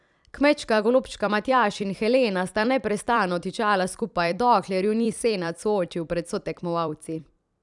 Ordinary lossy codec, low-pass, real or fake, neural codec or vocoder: none; 10.8 kHz; fake; vocoder, 44.1 kHz, 128 mel bands every 256 samples, BigVGAN v2